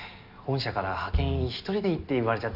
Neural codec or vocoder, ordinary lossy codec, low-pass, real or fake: none; none; 5.4 kHz; real